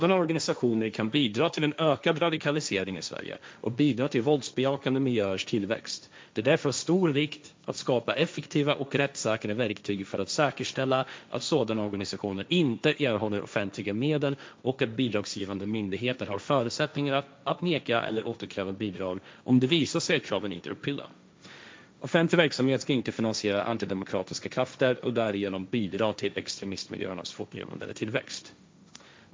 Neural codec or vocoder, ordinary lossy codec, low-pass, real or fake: codec, 16 kHz, 1.1 kbps, Voila-Tokenizer; none; none; fake